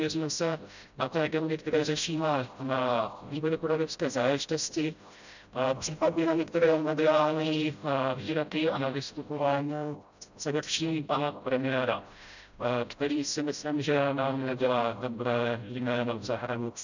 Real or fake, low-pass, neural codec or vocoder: fake; 7.2 kHz; codec, 16 kHz, 0.5 kbps, FreqCodec, smaller model